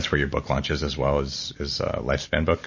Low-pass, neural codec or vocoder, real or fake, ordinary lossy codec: 7.2 kHz; none; real; MP3, 32 kbps